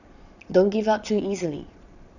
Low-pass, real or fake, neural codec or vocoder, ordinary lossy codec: 7.2 kHz; fake; vocoder, 22.05 kHz, 80 mel bands, Vocos; none